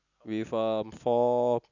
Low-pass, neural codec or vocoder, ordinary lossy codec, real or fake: 7.2 kHz; none; none; real